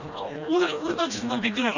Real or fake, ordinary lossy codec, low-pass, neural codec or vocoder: fake; none; 7.2 kHz; codec, 16 kHz, 1 kbps, FreqCodec, smaller model